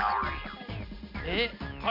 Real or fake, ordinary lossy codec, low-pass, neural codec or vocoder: real; MP3, 48 kbps; 5.4 kHz; none